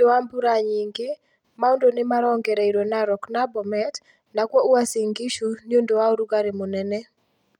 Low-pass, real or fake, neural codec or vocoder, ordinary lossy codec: 19.8 kHz; real; none; none